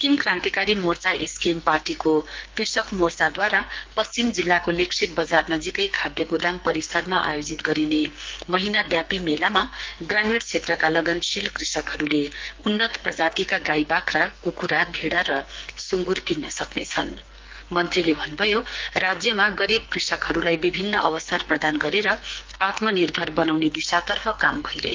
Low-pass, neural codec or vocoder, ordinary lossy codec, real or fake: 7.2 kHz; codec, 44.1 kHz, 2.6 kbps, SNAC; Opus, 24 kbps; fake